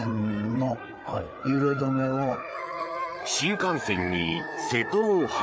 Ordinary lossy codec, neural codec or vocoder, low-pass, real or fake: none; codec, 16 kHz, 4 kbps, FreqCodec, larger model; none; fake